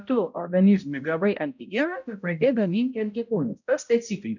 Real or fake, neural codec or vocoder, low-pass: fake; codec, 16 kHz, 0.5 kbps, X-Codec, HuBERT features, trained on balanced general audio; 7.2 kHz